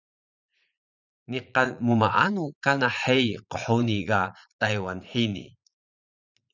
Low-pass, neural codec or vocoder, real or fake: 7.2 kHz; vocoder, 44.1 kHz, 80 mel bands, Vocos; fake